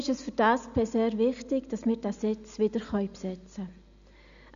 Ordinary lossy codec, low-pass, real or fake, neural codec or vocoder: none; 7.2 kHz; real; none